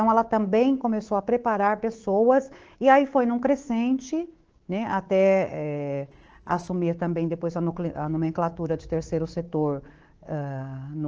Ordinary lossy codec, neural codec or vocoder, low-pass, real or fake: Opus, 16 kbps; codec, 24 kHz, 3.1 kbps, DualCodec; 7.2 kHz; fake